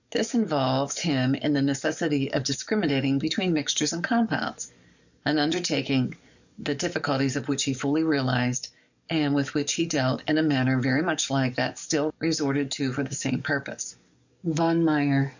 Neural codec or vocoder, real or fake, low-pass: codec, 44.1 kHz, 7.8 kbps, DAC; fake; 7.2 kHz